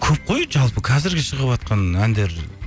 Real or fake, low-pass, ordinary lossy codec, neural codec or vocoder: real; none; none; none